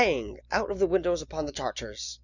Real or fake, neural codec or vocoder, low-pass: real; none; 7.2 kHz